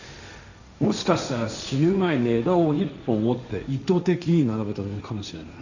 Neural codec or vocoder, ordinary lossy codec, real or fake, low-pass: codec, 16 kHz, 1.1 kbps, Voila-Tokenizer; none; fake; 7.2 kHz